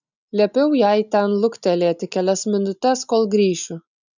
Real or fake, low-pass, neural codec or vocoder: real; 7.2 kHz; none